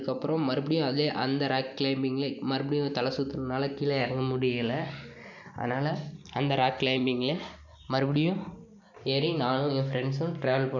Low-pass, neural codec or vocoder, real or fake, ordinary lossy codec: 7.2 kHz; none; real; none